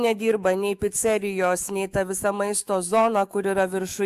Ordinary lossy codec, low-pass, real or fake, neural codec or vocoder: Opus, 64 kbps; 14.4 kHz; fake; autoencoder, 48 kHz, 128 numbers a frame, DAC-VAE, trained on Japanese speech